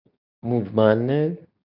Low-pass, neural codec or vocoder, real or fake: 5.4 kHz; codec, 24 kHz, 0.9 kbps, WavTokenizer, medium speech release version 2; fake